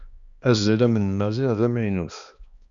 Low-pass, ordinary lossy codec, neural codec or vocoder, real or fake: 7.2 kHz; Opus, 64 kbps; codec, 16 kHz, 2 kbps, X-Codec, HuBERT features, trained on balanced general audio; fake